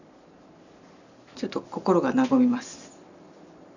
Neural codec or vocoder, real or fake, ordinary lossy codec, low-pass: none; real; none; 7.2 kHz